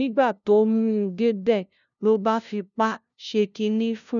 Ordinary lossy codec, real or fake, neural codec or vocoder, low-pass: none; fake; codec, 16 kHz, 0.5 kbps, FunCodec, trained on LibriTTS, 25 frames a second; 7.2 kHz